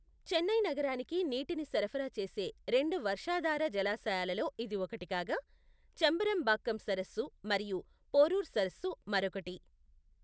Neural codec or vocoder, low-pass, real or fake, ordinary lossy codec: none; none; real; none